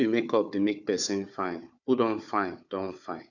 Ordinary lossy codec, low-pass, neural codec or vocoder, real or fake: none; 7.2 kHz; codec, 16 kHz, 4 kbps, FunCodec, trained on Chinese and English, 50 frames a second; fake